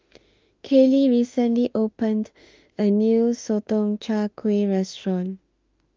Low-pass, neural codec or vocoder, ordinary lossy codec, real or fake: 7.2 kHz; autoencoder, 48 kHz, 32 numbers a frame, DAC-VAE, trained on Japanese speech; Opus, 32 kbps; fake